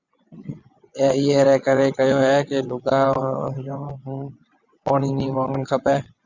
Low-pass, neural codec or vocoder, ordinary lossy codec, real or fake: 7.2 kHz; vocoder, 22.05 kHz, 80 mel bands, WaveNeXt; Opus, 64 kbps; fake